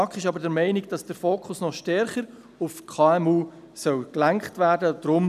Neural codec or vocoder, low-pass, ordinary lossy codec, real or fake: none; 14.4 kHz; none; real